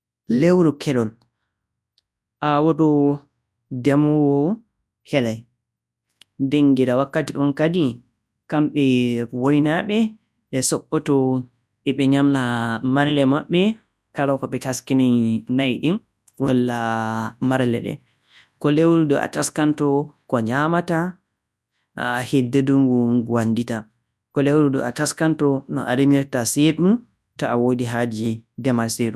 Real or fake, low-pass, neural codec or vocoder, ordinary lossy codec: fake; none; codec, 24 kHz, 0.9 kbps, WavTokenizer, large speech release; none